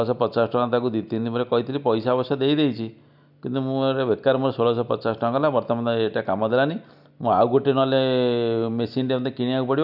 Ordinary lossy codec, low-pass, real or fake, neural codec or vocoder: none; 5.4 kHz; real; none